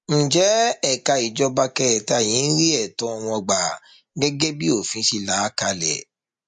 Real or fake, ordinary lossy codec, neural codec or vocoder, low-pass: real; MP3, 48 kbps; none; 10.8 kHz